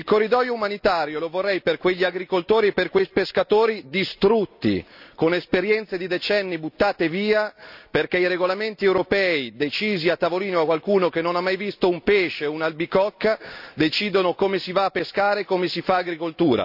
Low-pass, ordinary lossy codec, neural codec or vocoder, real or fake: 5.4 kHz; none; none; real